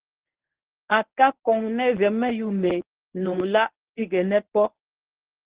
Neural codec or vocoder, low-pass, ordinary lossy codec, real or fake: codec, 24 kHz, 0.9 kbps, WavTokenizer, medium speech release version 1; 3.6 kHz; Opus, 16 kbps; fake